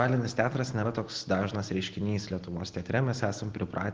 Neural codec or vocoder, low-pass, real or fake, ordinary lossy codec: none; 7.2 kHz; real; Opus, 16 kbps